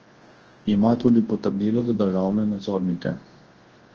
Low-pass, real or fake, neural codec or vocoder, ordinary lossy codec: 7.2 kHz; fake; codec, 24 kHz, 0.9 kbps, WavTokenizer, large speech release; Opus, 16 kbps